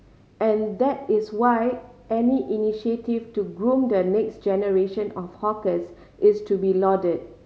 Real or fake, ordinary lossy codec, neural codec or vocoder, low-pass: real; none; none; none